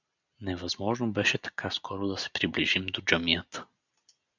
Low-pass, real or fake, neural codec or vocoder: 7.2 kHz; real; none